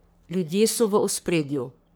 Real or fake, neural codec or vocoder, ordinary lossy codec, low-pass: fake; codec, 44.1 kHz, 3.4 kbps, Pupu-Codec; none; none